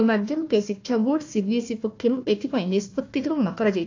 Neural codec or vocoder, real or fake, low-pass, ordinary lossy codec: codec, 16 kHz, 1 kbps, FunCodec, trained on Chinese and English, 50 frames a second; fake; 7.2 kHz; AAC, 48 kbps